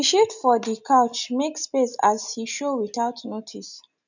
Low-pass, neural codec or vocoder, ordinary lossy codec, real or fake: 7.2 kHz; none; none; real